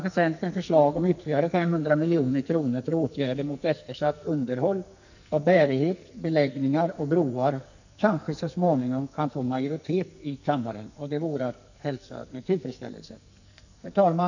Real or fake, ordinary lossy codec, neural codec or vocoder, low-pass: fake; none; codec, 44.1 kHz, 2.6 kbps, SNAC; 7.2 kHz